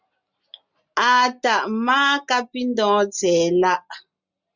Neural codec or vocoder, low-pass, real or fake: none; 7.2 kHz; real